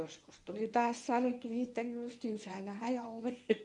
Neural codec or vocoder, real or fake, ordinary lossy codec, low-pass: codec, 24 kHz, 0.9 kbps, WavTokenizer, medium speech release version 1; fake; none; 10.8 kHz